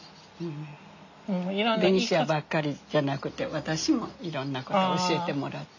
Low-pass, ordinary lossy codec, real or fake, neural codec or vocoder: 7.2 kHz; none; real; none